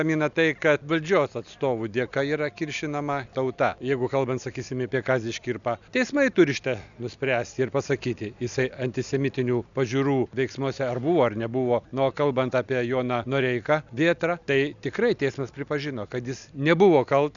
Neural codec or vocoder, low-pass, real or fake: none; 7.2 kHz; real